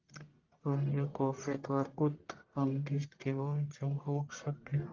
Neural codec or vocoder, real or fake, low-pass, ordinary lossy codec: codec, 44.1 kHz, 1.7 kbps, Pupu-Codec; fake; 7.2 kHz; Opus, 24 kbps